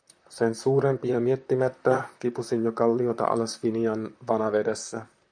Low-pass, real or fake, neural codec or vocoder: 9.9 kHz; fake; vocoder, 44.1 kHz, 128 mel bands, Pupu-Vocoder